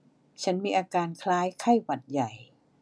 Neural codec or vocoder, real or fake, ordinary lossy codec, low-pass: none; real; none; none